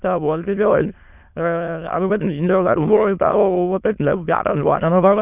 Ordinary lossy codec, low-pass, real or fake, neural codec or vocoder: none; 3.6 kHz; fake; autoencoder, 22.05 kHz, a latent of 192 numbers a frame, VITS, trained on many speakers